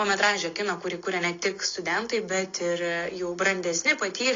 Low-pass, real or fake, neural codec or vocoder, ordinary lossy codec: 7.2 kHz; real; none; AAC, 32 kbps